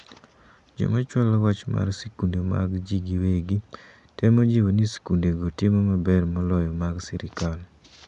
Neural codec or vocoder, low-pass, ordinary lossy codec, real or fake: none; 10.8 kHz; none; real